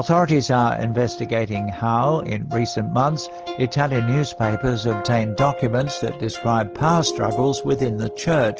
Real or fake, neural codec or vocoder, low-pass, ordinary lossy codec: real; none; 7.2 kHz; Opus, 16 kbps